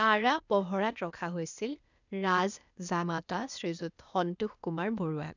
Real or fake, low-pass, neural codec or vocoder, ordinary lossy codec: fake; 7.2 kHz; codec, 16 kHz, 0.8 kbps, ZipCodec; none